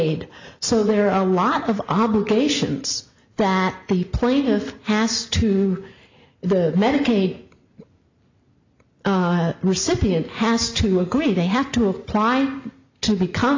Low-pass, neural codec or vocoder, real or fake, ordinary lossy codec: 7.2 kHz; none; real; AAC, 48 kbps